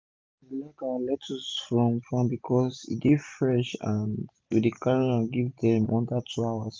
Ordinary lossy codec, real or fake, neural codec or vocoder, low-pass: none; real; none; none